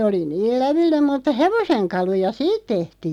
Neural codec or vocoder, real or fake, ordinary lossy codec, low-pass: none; real; none; 19.8 kHz